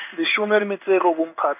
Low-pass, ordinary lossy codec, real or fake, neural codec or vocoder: 3.6 kHz; none; fake; codec, 16 kHz in and 24 kHz out, 1 kbps, XY-Tokenizer